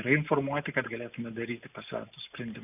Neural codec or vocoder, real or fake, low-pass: none; real; 3.6 kHz